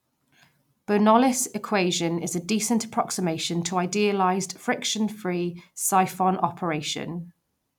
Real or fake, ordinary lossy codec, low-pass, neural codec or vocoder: real; none; 19.8 kHz; none